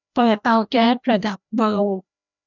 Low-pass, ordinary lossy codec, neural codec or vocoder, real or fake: 7.2 kHz; none; codec, 16 kHz, 1 kbps, FreqCodec, larger model; fake